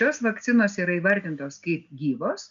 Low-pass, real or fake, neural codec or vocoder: 7.2 kHz; real; none